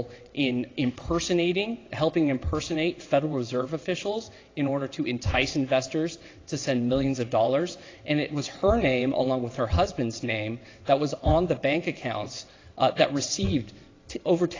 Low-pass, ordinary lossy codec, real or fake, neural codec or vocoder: 7.2 kHz; AAC, 32 kbps; fake; vocoder, 22.05 kHz, 80 mel bands, WaveNeXt